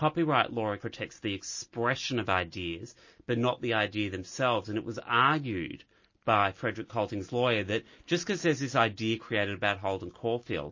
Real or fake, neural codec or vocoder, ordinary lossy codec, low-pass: real; none; MP3, 32 kbps; 7.2 kHz